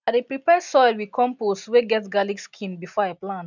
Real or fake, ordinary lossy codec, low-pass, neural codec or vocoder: real; none; 7.2 kHz; none